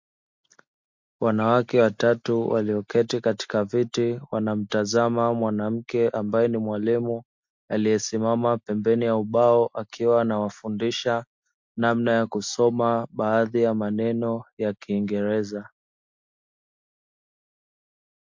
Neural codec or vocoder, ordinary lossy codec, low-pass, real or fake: none; MP3, 48 kbps; 7.2 kHz; real